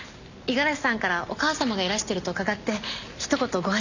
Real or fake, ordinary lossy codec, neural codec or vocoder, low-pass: real; none; none; 7.2 kHz